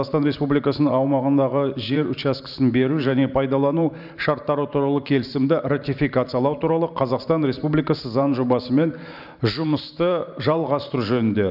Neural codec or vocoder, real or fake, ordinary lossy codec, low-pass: vocoder, 44.1 kHz, 128 mel bands every 512 samples, BigVGAN v2; fake; none; 5.4 kHz